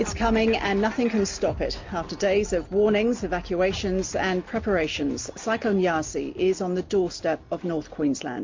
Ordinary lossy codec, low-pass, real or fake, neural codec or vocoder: MP3, 48 kbps; 7.2 kHz; real; none